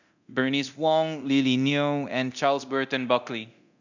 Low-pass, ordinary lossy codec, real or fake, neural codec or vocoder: 7.2 kHz; none; fake; codec, 24 kHz, 0.9 kbps, DualCodec